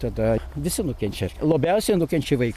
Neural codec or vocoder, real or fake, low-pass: none; real; 14.4 kHz